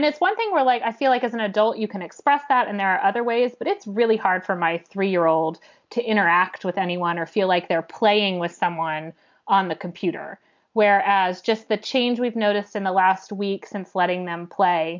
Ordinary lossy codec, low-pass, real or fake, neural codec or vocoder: MP3, 64 kbps; 7.2 kHz; real; none